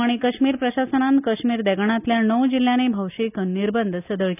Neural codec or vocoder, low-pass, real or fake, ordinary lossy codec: none; 3.6 kHz; real; none